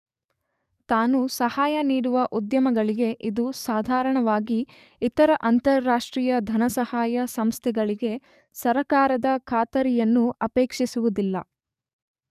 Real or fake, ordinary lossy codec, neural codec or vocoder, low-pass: fake; none; codec, 44.1 kHz, 7.8 kbps, DAC; 14.4 kHz